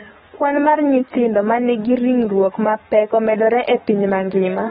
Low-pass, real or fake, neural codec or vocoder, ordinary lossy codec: 19.8 kHz; fake; codec, 44.1 kHz, 7.8 kbps, DAC; AAC, 16 kbps